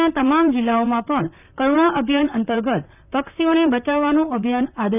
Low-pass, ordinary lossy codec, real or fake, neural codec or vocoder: 3.6 kHz; none; fake; vocoder, 44.1 kHz, 128 mel bands, Pupu-Vocoder